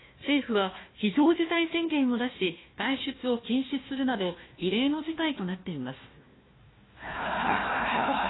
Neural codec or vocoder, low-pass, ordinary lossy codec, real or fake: codec, 16 kHz, 1 kbps, FunCodec, trained on Chinese and English, 50 frames a second; 7.2 kHz; AAC, 16 kbps; fake